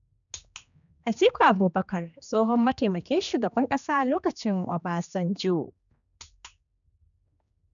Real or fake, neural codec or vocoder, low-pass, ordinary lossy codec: fake; codec, 16 kHz, 2 kbps, X-Codec, HuBERT features, trained on general audio; 7.2 kHz; none